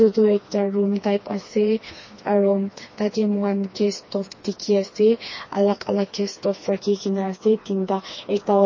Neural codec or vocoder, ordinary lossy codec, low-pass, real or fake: codec, 16 kHz, 2 kbps, FreqCodec, smaller model; MP3, 32 kbps; 7.2 kHz; fake